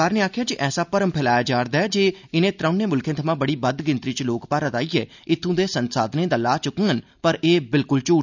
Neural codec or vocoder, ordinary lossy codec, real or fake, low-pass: none; none; real; 7.2 kHz